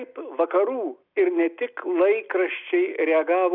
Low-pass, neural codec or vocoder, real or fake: 5.4 kHz; none; real